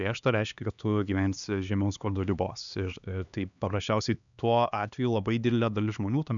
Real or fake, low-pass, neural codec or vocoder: fake; 7.2 kHz; codec, 16 kHz, 2 kbps, X-Codec, HuBERT features, trained on LibriSpeech